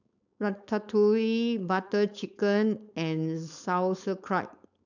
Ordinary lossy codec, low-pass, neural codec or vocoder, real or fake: none; 7.2 kHz; codec, 16 kHz, 4.8 kbps, FACodec; fake